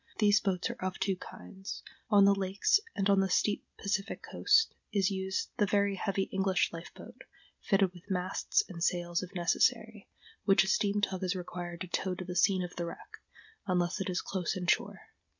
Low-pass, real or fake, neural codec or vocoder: 7.2 kHz; real; none